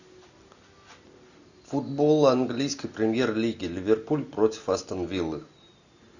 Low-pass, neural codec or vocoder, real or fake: 7.2 kHz; none; real